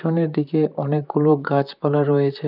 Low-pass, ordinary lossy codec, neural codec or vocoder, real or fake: 5.4 kHz; none; vocoder, 44.1 kHz, 128 mel bands, Pupu-Vocoder; fake